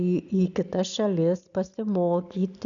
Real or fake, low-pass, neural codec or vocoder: real; 7.2 kHz; none